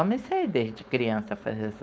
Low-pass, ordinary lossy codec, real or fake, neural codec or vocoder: none; none; fake; codec, 16 kHz, 4.8 kbps, FACodec